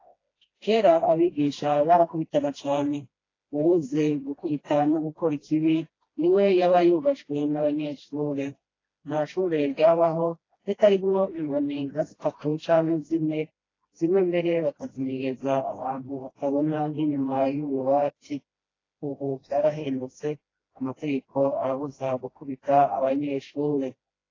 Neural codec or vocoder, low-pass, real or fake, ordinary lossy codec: codec, 16 kHz, 1 kbps, FreqCodec, smaller model; 7.2 kHz; fake; AAC, 32 kbps